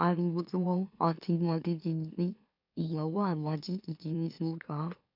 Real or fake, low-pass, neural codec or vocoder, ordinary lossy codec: fake; 5.4 kHz; autoencoder, 44.1 kHz, a latent of 192 numbers a frame, MeloTTS; none